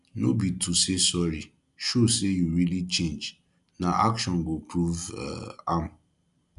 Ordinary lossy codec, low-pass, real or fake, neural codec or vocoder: none; 10.8 kHz; real; none